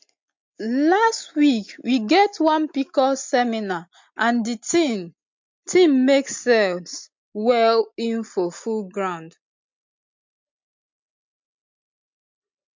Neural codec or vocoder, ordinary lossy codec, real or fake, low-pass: none; MP3, 48 kbps; real; 7.2 kHz